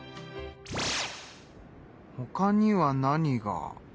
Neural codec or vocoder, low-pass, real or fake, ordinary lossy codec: none; none; real; none